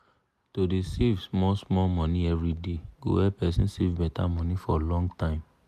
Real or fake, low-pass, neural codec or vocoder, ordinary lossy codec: real; 14.4 kHz; none; none